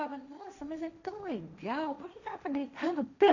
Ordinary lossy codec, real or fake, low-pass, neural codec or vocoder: none; fake; 7.2 kHz; codec, 16 kHz, 1.1 kbps, Voila-Tokenizer